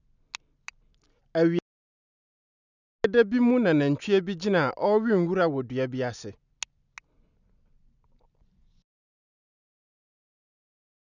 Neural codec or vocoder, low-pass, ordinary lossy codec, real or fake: none; 7.2 kHz; none; real